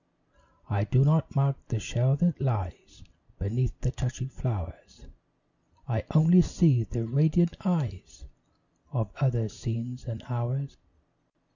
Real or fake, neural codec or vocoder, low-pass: real; none; 7.2 kHz